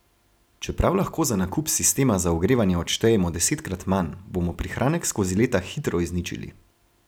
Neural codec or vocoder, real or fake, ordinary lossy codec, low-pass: none; real; none; none